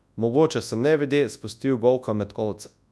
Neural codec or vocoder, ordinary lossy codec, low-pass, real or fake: codec, 24 kHz, 0.9 kbps, WavTokenizer, large speech release; none; none; fake